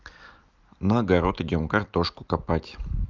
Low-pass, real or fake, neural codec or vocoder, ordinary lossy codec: 7.2 kHz; real; none; Opus, 32 kbps